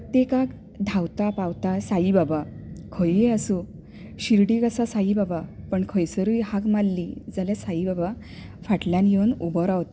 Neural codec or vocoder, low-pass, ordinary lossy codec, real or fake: none; none; none; real